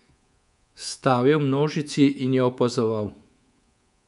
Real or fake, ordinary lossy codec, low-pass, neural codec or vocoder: fake; none; 10.8 kHz; codec, 24 kHz, 3.1 kbps, DualCodec